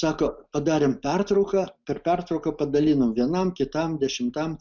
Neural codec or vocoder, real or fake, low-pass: none; real; 7.2 kHz